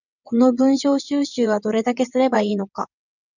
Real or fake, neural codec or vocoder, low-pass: fake; vocoder, 22.05 kHz, 80 mel bands, WaveNeXt; 7.2 kHz